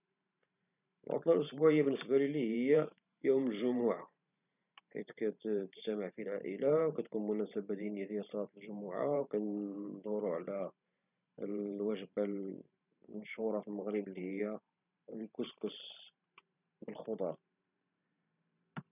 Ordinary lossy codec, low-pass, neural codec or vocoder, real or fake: none; 3.6 kHz; vocoder, 44.1 kHz, 128 mel bands every 512 samples, BigVGAN v2; fake